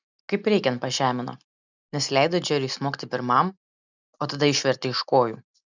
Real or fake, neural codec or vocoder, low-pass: real; none; 7.2 kHz